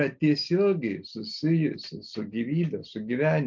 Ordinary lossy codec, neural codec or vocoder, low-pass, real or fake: MP3, 64 kbps; none; 7.2 kHz; real